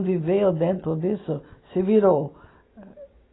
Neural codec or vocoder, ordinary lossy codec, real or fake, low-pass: none; AAC, 16 kbps; real; 7.2 kHz